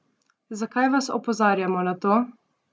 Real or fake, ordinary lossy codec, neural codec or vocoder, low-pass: real; none; none; none